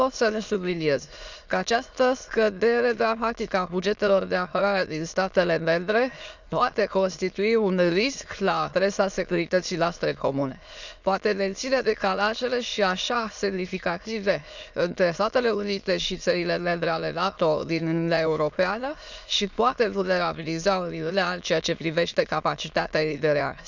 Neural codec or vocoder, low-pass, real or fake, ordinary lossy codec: autoencoder, 22.05 kHz, a latent of 192 numbers a frame, VITS, trained on many speakers; 7.2 kHz; fake; none